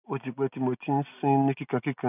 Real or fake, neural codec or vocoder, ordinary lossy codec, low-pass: real; none; none; 3.6 kHz